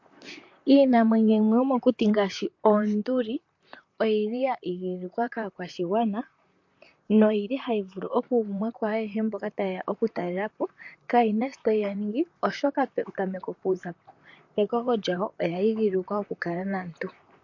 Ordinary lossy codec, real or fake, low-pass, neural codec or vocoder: MP3, 48 kbps; fake; 7.2 kHz; vocoder, 44.1 kHz, 128 mel bands, Pupu-Vocoder